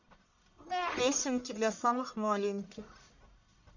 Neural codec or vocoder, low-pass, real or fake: codec, 44.1 kHz, 1.7 kbps, Pupu-Codec; 7.2 kHz; fake